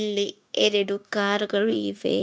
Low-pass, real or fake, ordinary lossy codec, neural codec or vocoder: none; fake; none; codec, 16 kHz, 0.9 kbps, LongCat-Audio-Codec